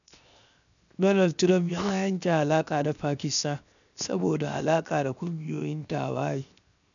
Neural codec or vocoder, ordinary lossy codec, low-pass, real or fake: codec, 16 kHz, 0.7 kbps, FocalCodec; none; 7.2 kHz; fake